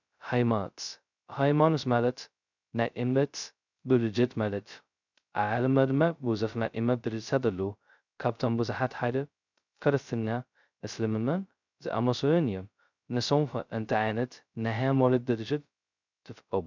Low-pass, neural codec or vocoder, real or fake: 7.2 kHz; codec, 16 kHz, 0.2 kbps, FocalCodec; fake